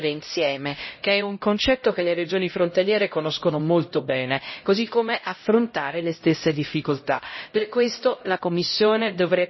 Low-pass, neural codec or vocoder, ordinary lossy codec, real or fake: 7.2 kHz; codec, 16 kHz, 0.5 kbps, X-Codec, HuBERT features, trained on LibriSpeech; MP3, 24 kbps; fake